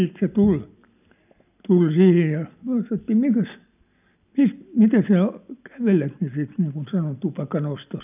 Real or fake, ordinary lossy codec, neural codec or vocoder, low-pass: real; none; none; 3.6 kHz